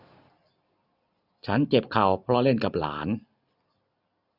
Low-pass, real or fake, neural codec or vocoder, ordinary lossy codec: 5.4 kHz; real; none; none